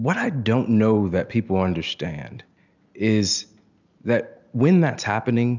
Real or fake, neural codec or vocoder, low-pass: real; none; 7.2 kHz